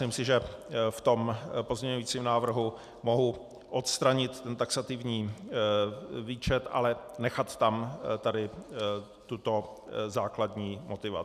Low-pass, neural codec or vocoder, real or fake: 14.4 kHz; none; real